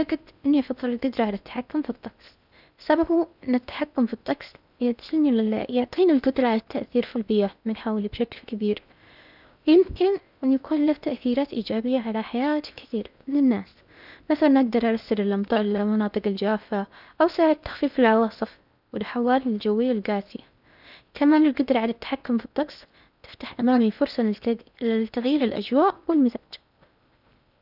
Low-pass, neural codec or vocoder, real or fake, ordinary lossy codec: 5.4 kHz; codec, 16 kHz in and 24 kHz out, 0.8 kbps, FocalCodec, streaming, 65536 codes; fake; none